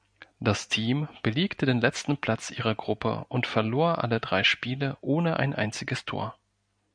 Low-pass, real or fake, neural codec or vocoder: 9.9 kHz; real; none